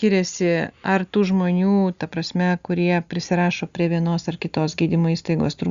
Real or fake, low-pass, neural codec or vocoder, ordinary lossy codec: real; 7.2 kHz; none; Opus, 64 kbps